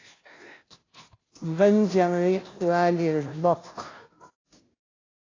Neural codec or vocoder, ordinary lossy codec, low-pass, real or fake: codec, 16 kHz, 0.5 kbps, FunCodec, trained on Chinese and English, 25 frames a second; AAC, 32 kbps; 7.2 kHz; fake